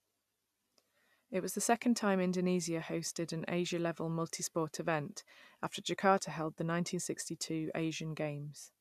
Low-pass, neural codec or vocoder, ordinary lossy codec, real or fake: 14.4 kHz; none; none; real